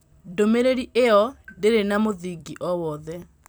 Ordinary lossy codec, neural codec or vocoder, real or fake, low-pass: none; vocoder, 44.1 kHz, 128 mel bands every 256 samples, BigVGAN v2; fake; none